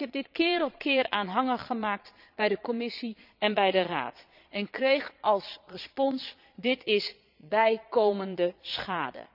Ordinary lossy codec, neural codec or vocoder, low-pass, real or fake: none; vocoder, 22.05 kHz, 80 mel bands, Vocos; 5.4 kHz; fake